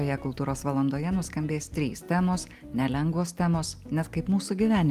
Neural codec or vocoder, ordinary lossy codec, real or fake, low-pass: none; Opus, 32 kbps; real; 14.4 kHz